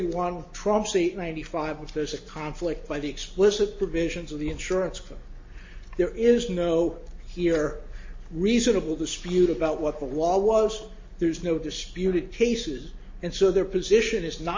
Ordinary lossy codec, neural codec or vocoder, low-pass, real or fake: MP3, 32 kbps; none; 7.2 kHz; real